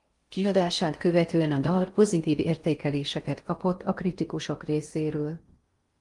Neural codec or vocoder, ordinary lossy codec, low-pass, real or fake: codec, 16 kHz in and 24 kHz out, 0.6 kbps, FocalCodec, streaming, 4096 codes; Opus, 24 kbps; 10.8 kHz; fake